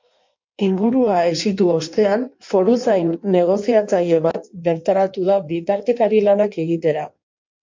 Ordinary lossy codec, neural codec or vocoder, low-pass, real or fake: MP3, 48 kbps; codec, 16 kHz in and 24 kHz out, 1.1 kbps, FireRedTTS-2 codec; 7.2 kHz; fake